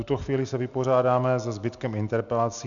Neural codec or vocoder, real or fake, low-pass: none; real; 7.2 kHz